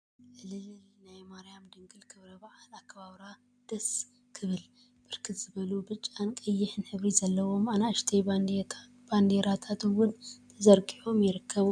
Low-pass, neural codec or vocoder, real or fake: 9.9 kHz; none; real